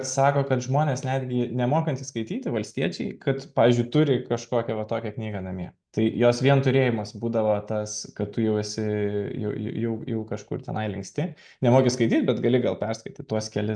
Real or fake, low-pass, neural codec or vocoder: real; 9.9 kHz; none